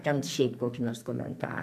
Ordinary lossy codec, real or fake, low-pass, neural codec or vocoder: AAC, 96 kbps; fake; 14.4 kHz; codec, 44.1 kHz, 2.6 kbps, SNAC